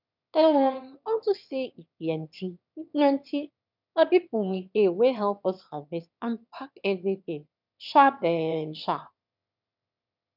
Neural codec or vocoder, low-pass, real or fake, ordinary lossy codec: autoencoder, 22.05 kHz, a latent of 192 numbers a frame, VITS, trained on one speaker; 5.4 kHz; fake; none